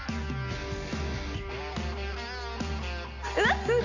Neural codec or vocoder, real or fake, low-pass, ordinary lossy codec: none; real; 7.2 kHz; none